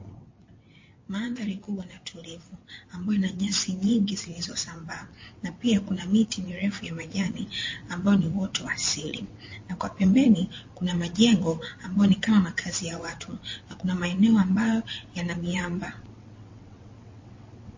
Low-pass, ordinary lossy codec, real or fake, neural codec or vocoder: 7.2 kHz; MP3, 32 kbps; fake; vocoder, 22.05 kHz, 80 mel bands, WaveNeXt